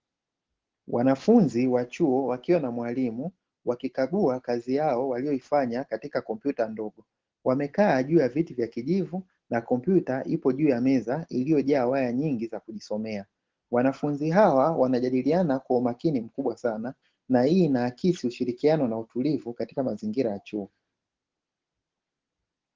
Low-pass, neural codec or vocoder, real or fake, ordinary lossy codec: 7.2 kHz; none; real; Opus, 16 kbps